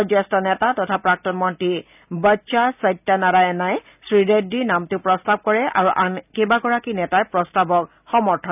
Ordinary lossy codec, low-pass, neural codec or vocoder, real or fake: none; 3.6 kHz; none; real